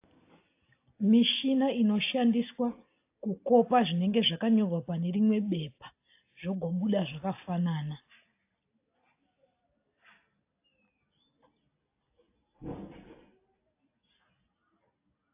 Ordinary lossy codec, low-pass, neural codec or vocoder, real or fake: AAC, 24 kbps; 3.6 kHz; none; real